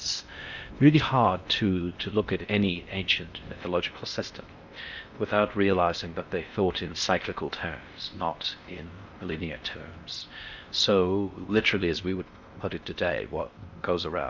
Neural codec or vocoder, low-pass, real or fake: codec, 16 kHz in and 24 kHz out, 0.6 kbps, FocalCodec, streaming, 4096 codes; 7.2 kHz; fake